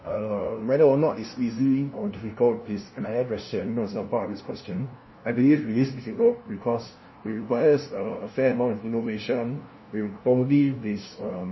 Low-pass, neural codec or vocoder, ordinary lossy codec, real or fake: 7.2 kHz; codec, 16 kHz, 0.5 kbps, FunCodec, trained on LibriTTS, 25 frames a second; MP3, 24 kbps; fake